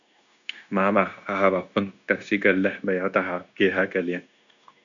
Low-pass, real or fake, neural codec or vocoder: 7.2 kHz; fake; codec, 16 kHz, 0.9 kbps, LongCat-Audio-Codec